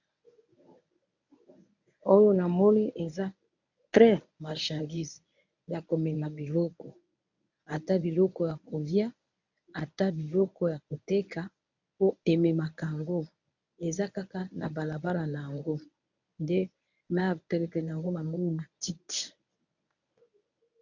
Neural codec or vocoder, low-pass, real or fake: codec, 24 kHz, 0.9 kbps, WavTokenizer, medium speech release version 1; 7.2 kHz; fake